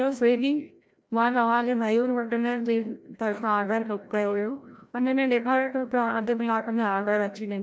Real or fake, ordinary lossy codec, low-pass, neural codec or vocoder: fake; none; none; codec, 16 kHz, 0.5 kbps, FreqCodec, larger model